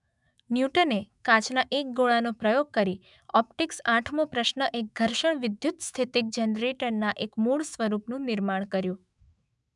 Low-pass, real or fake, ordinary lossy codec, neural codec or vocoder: 10.8 kHz; fake; none; autoencoder, 48 kHz, 128 numbers a frame, DAC-VAE, trained on Japanese speech